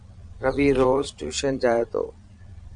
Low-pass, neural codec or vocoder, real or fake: 9.9 kHz; vocoder, 22.05 kHz, 80 mel bands, Vocos; fake